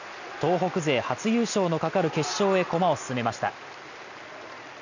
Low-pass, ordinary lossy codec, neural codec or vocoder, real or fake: 7.2 kHz; none; none; real